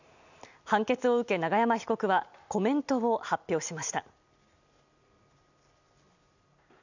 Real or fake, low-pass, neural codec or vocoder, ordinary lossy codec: real; 7.2 kHz; none; none